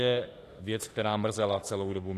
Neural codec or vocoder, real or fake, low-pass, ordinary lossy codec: autoencoder, 48 kHz, 32 numbers a frame, DAC-VAE, trained on Japanese speech; fake; 14.4 kHz; AAC, 48 kbps